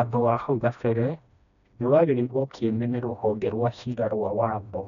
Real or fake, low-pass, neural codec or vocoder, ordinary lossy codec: fake; 7.2 kHz; codec, 16 kHz, 1 kbps, FreqCodec, smaller model; none